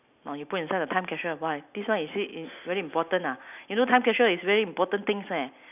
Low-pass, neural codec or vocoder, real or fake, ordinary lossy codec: 3.6 kHz; none; real; none